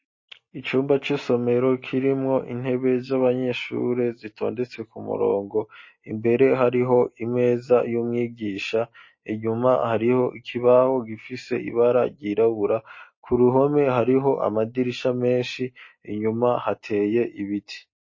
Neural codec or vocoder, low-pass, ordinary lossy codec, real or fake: none; 7.2 kHz; MP3, 32 kbps; real